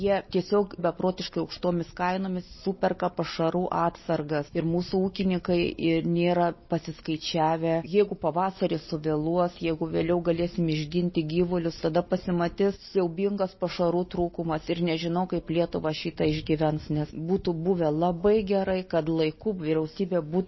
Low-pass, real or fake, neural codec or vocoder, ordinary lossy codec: 7.2 kHz; real; none; MP3, 24 kbps